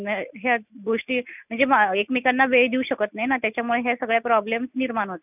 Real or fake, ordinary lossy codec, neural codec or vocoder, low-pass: real; none; none; 3.6 kHz